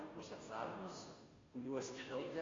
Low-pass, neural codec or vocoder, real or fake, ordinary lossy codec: 7.2 kHz; codec, 16 kHz, 0.5 kbps, FunCodec, trained on Chinese and English, 25 frames a second; fake; AAC, 32 kbps